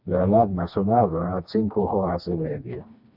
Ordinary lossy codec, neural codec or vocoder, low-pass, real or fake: Opus, 64 kbps; codec, 16 kHz, 2 kbps, FreqCodec, smaller model; 5.4 kHz; fake